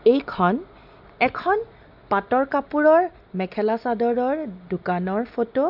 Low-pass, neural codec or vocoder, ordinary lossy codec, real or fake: 5.4 kHz; none; none; real